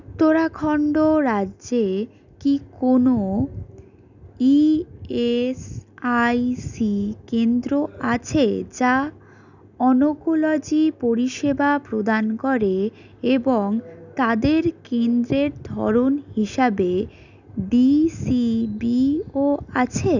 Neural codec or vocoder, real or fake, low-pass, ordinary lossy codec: none; real; 7.2 kHz; none